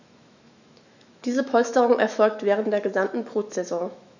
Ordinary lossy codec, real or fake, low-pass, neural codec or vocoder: none; fake; 7.2 kHz; autoencoder, 48 kHz, 128 numbers a frame, DAC-VAE, trained on Japanese speech